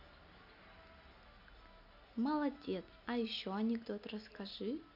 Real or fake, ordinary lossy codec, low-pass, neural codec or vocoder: real; none; 5.4 kHz; none